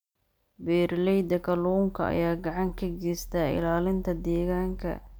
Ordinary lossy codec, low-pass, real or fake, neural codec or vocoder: none; none; real; none